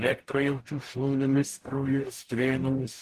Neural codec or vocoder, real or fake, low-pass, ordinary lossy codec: codec, 44.1 kHz, 0.9 kbps, DAC; fake; 14.4 kHz; Opus, 16 kbps